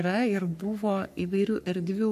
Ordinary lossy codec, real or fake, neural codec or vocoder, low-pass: AAC, 96 kbps; fake; codec, 44.1 kHz, 3.4 kbps, Pupu-Codec; 14.4 kHz